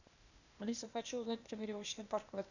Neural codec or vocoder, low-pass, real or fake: codec, 16 kHz, 0.8 kbps, ZipCodec; 7.2 kHz; fake